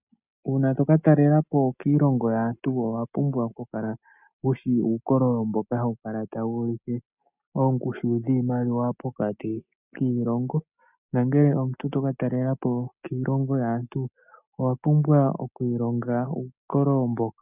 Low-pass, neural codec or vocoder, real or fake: 3.6 kHz; none; real